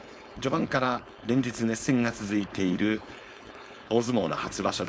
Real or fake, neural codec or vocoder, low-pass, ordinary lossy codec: fake; codec, 16 kHz, 4.8 kbps, FACodec; none; none